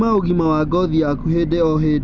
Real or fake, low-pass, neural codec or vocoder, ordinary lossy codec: real; 7.2 kHz; none; none